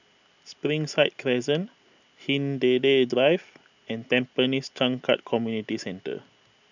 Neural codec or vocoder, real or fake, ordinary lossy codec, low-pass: none; real; none; 7.2 kHz